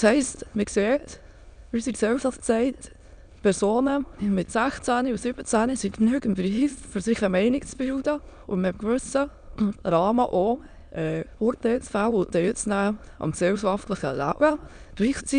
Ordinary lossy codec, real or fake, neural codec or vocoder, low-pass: none; fake; autoencoder, 22.05 kHz, a latent of 192 numbers a frame, VITS, trained on many speakers; 9.9 kHz